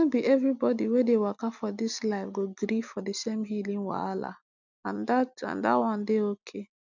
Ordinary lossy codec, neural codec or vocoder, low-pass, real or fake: none; none; 7.2 kHz; real